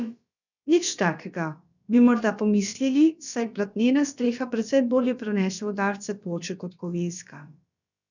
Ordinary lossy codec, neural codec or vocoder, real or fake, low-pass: none; codec, 16 kHz, about 1 kbps, DyCAST, with the encoder's durations; fake; 7.2 kHz